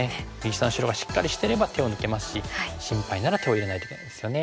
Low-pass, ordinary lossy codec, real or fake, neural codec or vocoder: none; none; real; none